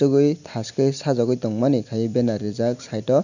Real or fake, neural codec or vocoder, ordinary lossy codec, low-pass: real; none; none; 7.2 kHz